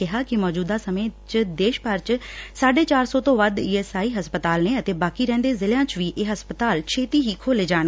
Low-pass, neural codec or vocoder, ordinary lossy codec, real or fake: none; none; none; real